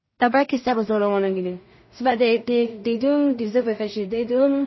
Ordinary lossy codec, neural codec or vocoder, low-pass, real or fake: MP3, 24 kbps; codec, 16 kHz in and 24 kHz out, 0.4 kbps, LongCat-Audio-Codec, two codebook decoder; 7.2 kHz; fake